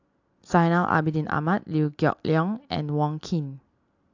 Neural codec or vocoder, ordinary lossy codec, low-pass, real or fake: none; AAC, 48 kbps; 7.2 kHz; real